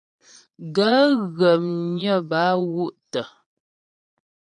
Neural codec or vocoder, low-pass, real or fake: vocoder, 22.05 kHz, 80 mel bands, Vocos; 9.9 kHz; fake